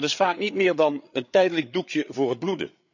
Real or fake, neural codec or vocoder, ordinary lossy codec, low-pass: fake; codec, 16 kHz, 8 kbps, FreqCodec, larger model; none; 7.2 kHz